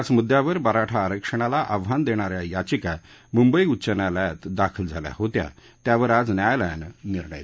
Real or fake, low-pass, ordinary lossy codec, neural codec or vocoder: real; none; none; none